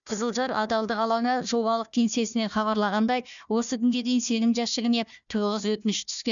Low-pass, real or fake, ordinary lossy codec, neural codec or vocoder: 7.2 kHz; fake; none; codec, 16 kHz, 1 kbps, FunCodec, trained on Chinese and English, 50 frames a second